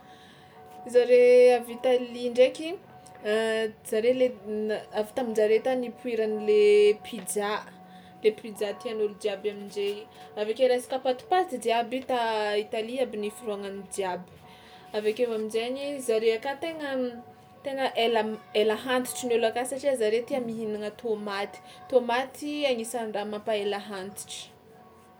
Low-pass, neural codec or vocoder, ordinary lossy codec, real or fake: none; none; none; real